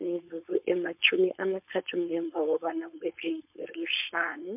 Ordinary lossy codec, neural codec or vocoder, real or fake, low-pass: MP3, 32 kbps; codec, 16 kHz, 4.8 kbps, FACodec; fake; 3.6 kHz